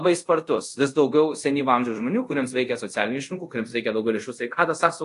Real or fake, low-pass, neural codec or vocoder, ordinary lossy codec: fake; 10.8 kHz; codec, 24 kHz, 0.5 kbps, DualCodec; AAC, 48 kbps